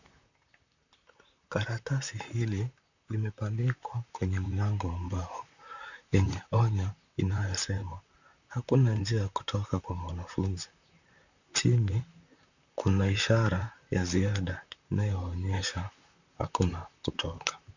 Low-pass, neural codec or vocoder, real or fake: 7.2 kHz; vocoder, 44.1 kHz, 128 mel bands, Pupu-Vocoder; fake